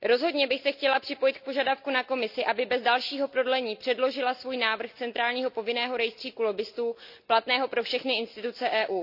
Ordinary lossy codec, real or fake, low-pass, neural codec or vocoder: none; real; 5.4 kHz; none